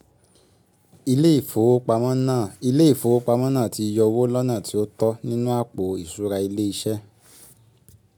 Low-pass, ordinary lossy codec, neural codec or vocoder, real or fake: none; none; none; real